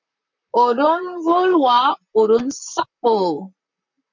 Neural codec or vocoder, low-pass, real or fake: vocoder, 44.1 kHz, 128 mel bands, Pupu-Vocoder; 7.2 kHz; fake